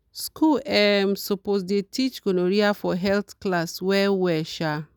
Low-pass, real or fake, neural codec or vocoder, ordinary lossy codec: none; real; none; none